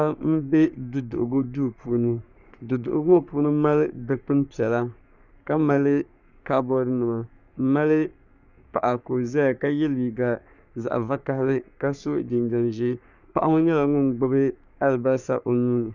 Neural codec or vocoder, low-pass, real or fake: codec, 44.1 kHz, 3.4 kbps, Pupu-Codec; 7.2 kHz; fake